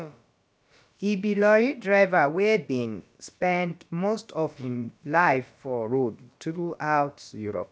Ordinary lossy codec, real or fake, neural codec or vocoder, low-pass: none; fake; codec, 16 kHz, about 1 kbps, DyCAST, with the encoder's durations; none